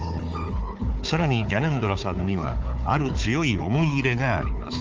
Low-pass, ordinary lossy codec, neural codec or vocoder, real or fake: 7.2 kHz; Opus, 24 kbps; codec, 16 kHz, 4 kbps, FunCodec, trained on Chinese and English, 50 frames a second; fake